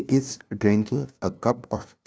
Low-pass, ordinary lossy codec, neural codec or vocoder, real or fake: none; none; codec, 16 kHz, 0.5 kbps, FunCodec, trained on LibriTTS, 25 frames a second; fake